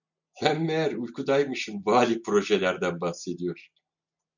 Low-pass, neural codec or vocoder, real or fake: 7.2 kHz; none; real